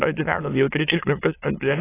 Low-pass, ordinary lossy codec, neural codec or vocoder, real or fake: 3.6 kHz; AAC, 16 kbps; autoencoder, 22.05 kHz, a latent of 192 numbers a frame, VITS, trained on many speakers; fake